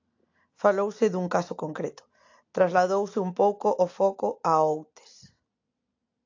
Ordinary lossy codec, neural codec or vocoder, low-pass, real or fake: AAC, 48 kbps; none; 7.2 kHz; real